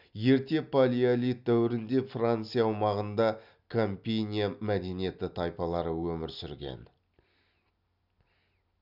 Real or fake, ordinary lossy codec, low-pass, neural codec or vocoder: real; none; 5.4 kHz; none